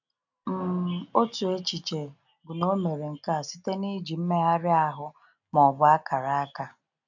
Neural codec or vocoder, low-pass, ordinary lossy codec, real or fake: none; 7.2 kHz; none; real